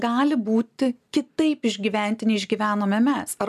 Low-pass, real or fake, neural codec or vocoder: 14.4 kHz; real; none